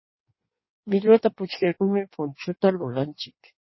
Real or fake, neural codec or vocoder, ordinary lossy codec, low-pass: fake; codec, 16 kHz in and 24 kHz out, 1.1 kbps, FireRedTTS-2 codec; MP3, 24 kbps; 7.2 kHz